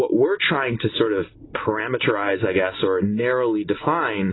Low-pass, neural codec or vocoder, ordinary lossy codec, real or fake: 7.2 kHz; none; AAC, 16 kbps; real